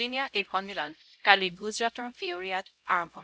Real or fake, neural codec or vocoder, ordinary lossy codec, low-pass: fake; codec, 16 kHz, 0.5 kbps, X-Codec, HuBERT features, trained on LibriSpeech; none; none